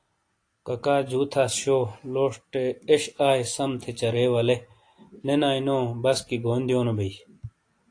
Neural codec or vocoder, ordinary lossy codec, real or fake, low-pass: none; AAC, 48 kbps; real; 9.9 kHz